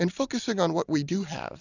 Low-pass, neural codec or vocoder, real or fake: 7.2 kHz; none; real